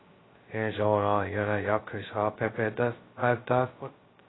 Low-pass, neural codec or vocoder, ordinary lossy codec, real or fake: 7.2 kHz; codec, 16 kHz, 0.2 kbps, FocalCodec; AAC, 16 kbps; fake